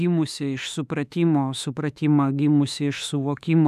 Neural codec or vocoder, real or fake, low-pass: autoencoder, 48 kHz, 32 numbers a frame, DAC-VAE, trained on Japanese speech; fake; 14.4 kHz